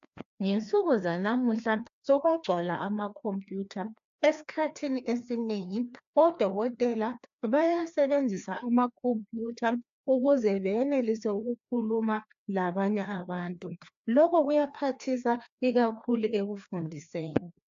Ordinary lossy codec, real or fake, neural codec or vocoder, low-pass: AAC, 64 kbps; fake; codec, 16 kHz, 2 kbps, FreqCodec, larger model; 7.2 kHz